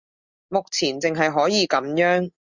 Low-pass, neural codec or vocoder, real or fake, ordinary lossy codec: 7.2 kHz; none; real; Opus, 64 kbps